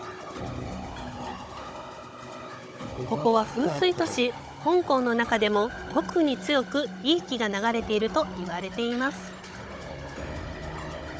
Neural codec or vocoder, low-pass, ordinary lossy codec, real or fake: codec, 16 kHz, 16 kbps, FunCodec, trained on Chinese and English, 50 frames a second; none; none; fake